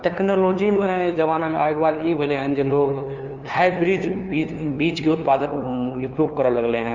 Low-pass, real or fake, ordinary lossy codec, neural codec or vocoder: 7.2 kHz; fake; Opus, 32 kbps; codec, 16 kHz, 2 kbps, FunCodec, trained on LibriTTS, 25 frames a second